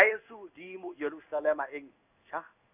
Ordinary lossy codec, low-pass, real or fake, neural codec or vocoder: none; 3.6 kHz; fake; codec, 16 kHz in and 24 kHz out, 1 kbps, XY-Tokenizer